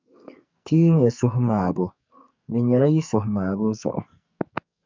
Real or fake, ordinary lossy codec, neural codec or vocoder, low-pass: fake; MP3, 64 kbps; codec, 44.1 kHz, 2.6 kbps, SNAC; 7.2 kHz